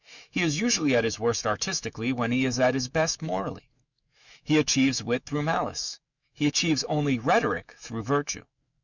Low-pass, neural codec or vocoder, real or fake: 7.2 kHz; autoencoder, 48 kHz, 128 numbers a frame, DAC-VAE, trained on Japanese speech; fake